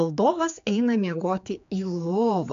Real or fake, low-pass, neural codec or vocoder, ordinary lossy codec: fake; 7.2 kHz; codec, 16 kHz, 4 kbps, X-Codec, HuBERT features, trained on general audio; AAC, 64 kbps